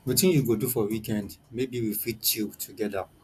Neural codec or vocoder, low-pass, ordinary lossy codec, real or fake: none; 14.4 kHz; AAC, 96 kbps; real